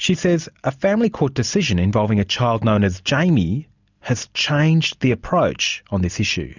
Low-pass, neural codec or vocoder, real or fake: 7.2 kHz; none; real